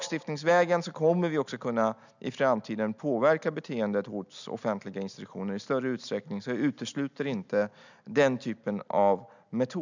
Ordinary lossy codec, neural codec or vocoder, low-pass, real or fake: none; none; 7.2 kHz; real